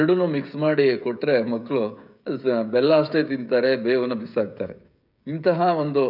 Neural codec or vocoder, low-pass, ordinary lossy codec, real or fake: codec, 16 kHz, 16 kbps, FreqCodec, smaller model; 5.4 kHz; none; fake